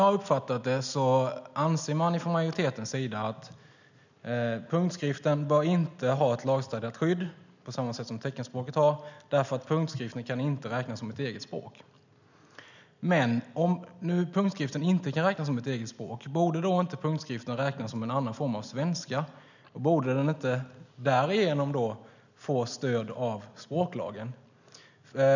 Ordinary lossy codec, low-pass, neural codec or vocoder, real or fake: none; 7.2 kHz; none; real